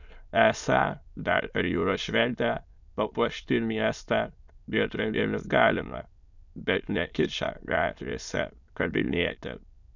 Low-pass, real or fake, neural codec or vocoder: 7.2 kHz; fake; autoencoder, 22.05 kHz, a latent of 192 numbers a frame, VITS, trained on many speakers